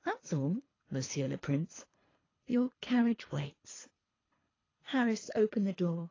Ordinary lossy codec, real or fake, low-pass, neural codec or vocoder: AAC, 32 kbps; fake; 7.2 kHz; codec, 24 kHz, 3 kbps, HILCodec